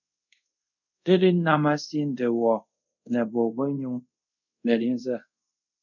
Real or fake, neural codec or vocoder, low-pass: fake; codec, 24 kHz, 0.5 kbps, DualCodec; 7.2 kHz